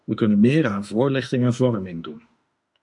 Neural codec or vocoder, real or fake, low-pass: autoencoder, 48 kHz, 32 numbers a frame, DAC-VAE, trained on Japanese speech; fake; 10.8 kHz